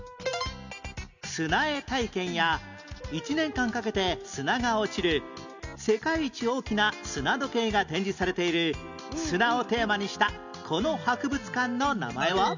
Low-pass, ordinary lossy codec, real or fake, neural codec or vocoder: 7.2 kHz; none; real; none